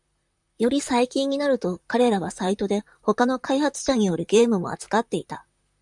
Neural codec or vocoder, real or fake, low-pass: vocoder, 44.1 kHz, 128 mel bands, Pupu-Vocoder; fake; 10.8 kHz